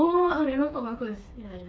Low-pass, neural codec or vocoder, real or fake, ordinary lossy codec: none; codec, 16 kHz, 4 kbps, FreqCodec, smaller model; fake; none